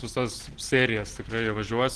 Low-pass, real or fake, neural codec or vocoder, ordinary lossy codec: 10.8 kHz; real; none; Opus, 16 kbps